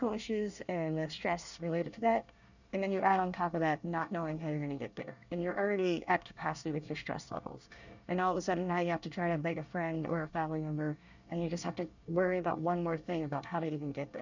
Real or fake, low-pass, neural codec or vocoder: fake; 7.2 kHz; codec, 24 kHz, 1 kbps, SNAC